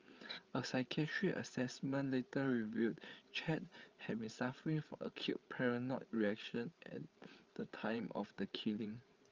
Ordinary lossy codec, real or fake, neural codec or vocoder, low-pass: Opus, 32 kbps; fake; codec, 16 kHz, 8 kbps, FreqCodec, larger model; 7.2 kHz